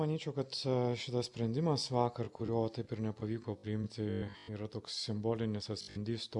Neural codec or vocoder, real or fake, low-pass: none; real; 10.8 kHz